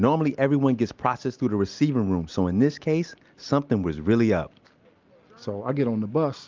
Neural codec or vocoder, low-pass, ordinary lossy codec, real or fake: none; 7.2 kHz; Opus, 24 kbps; real